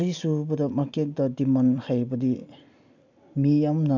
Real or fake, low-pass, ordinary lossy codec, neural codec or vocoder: real; 7.2 kHz; none; none